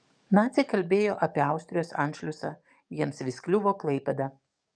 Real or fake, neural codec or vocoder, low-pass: fake; codec, 44.1 kHz, 7.8 kbps, DAC; 9.9 kHz